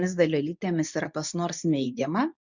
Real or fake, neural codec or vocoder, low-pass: fake; codec, 24 kHz, 0.9 kbps, WavTokenizer, medium speech release version 2; 7.2 kHz